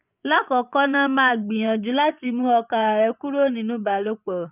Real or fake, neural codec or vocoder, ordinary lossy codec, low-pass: fake; vocoder, 24 kHz, 100 mel bands, Vocos; none; 3.6 kHz